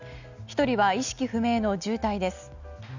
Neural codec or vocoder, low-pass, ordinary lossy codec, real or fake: none; 7.2 kHz; none; real